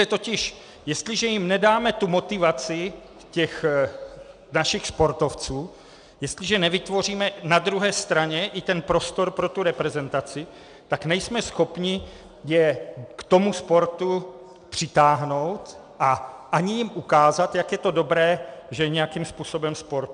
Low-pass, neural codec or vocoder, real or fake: 9.9 kHz; none; real